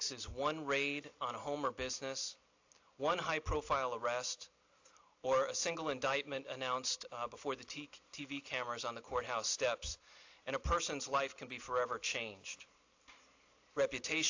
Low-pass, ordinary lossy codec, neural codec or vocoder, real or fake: 7.2 kHz; AAC, 48 kbps; none; real